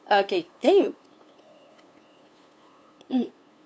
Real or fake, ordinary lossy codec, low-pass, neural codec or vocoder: fake; none; none; codec, 16 kHz, 2 kbps, FunCodec, trained on LibriTTS, 25 frames a second